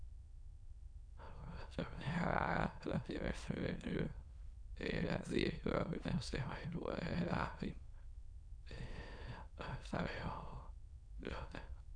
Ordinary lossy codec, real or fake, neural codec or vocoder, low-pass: none; fake; autoencoder, 22.05 kHz, a latent of 192 numbers a frame, VITS, trained on many speakers; 9.9 kHz